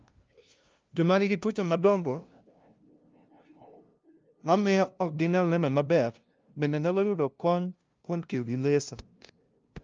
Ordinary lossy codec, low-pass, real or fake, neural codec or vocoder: Opus, 24 kbps; 7.2 kHz; fake; codec, 16 kHz, 0.5 kbps, FunCodec, trained on LibriTTS, 25 frames a second